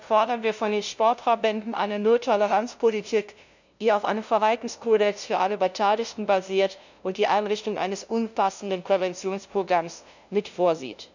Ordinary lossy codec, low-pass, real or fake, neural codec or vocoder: none; 7.2 kHz; fake; codec, 16 kHz, 0.5 kbps, FunCodec, trained on LibriTTS, 25 frames a second